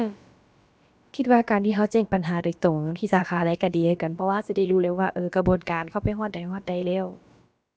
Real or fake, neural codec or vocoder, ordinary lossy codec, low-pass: fake; codec, 16 kHz, about 1 kbps, DyCAST, with the encoder's durations; none; none